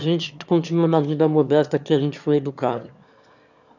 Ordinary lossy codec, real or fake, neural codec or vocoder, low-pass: none; fake; autoencoder, 22.05 kHz, a latent of 192 numbers a frame, VITS, trained on one speaker; 7.2 kHz